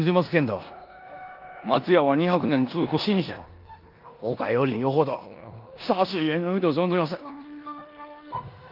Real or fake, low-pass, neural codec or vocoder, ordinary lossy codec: fake; 5.4 kHz; codec, 16 kHz in and 24 kHz out, 0.9 kbps, LongCat-Audio-Codec, four codebook decoder; Opus, 32 kbps